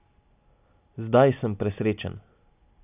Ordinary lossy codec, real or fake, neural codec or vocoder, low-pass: none; real; none; 3.6 kHz